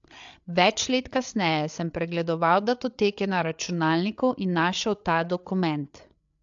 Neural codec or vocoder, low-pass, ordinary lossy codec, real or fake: codec, 16 kHz, 8 kbps, FreqCodec, larger model; 7.2 kHz; AAC, 64 kbps; fake